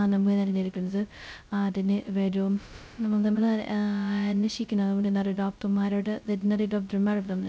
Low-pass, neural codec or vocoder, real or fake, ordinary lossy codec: none; codec, 16 kHz, 0.2 kbps, FocalCodec; fake; none